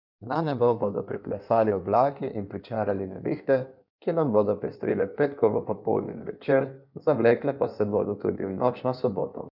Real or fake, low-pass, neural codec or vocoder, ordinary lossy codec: fake; 5.4 kHz; codec, 16 kHz in and 24 kHz out, 1.1 kbps, FireRedTTS-2 codec; none